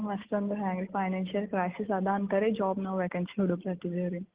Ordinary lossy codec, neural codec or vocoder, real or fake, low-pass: Opus, 16 kbps; none; real; 3.6 kHz